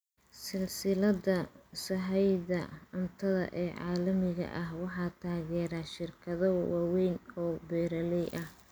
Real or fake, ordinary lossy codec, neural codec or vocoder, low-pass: real; none; none; none